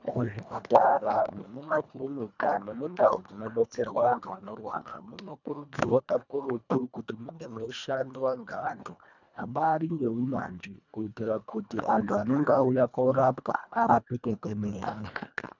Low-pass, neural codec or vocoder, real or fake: 7.2 kHz; codec, 24 kHz, 1.5 kbps, HILCodec; fake